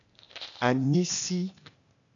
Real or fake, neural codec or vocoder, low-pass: fake; codec, 16 kHz, 0.8 kbps, ZipCodec; 7.2 kHz